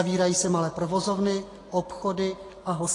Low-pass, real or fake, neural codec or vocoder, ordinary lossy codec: 10.8 kHz; real; none; AAC, 32 kbps